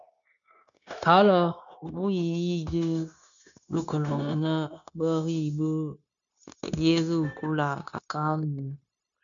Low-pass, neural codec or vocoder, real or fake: 7.2 kHz; codec, 16 kHz, 0.9 kbps, LongCat-Audio-Codec; fake